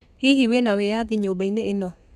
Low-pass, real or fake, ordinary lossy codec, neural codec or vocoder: 14.4 kHz; fake; none; codec, 32 kHz, 1.9 kbps, SNAC